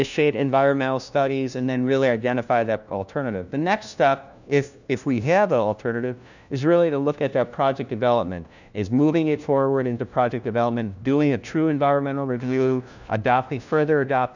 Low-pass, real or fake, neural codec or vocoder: 7.2 kHz; fake; codec, 16 kHz, 1 kbps, FunCodec, trained on LibriTTS, 50 frames a second